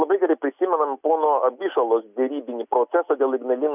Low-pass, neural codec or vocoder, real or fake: 3.6 kHz; none; real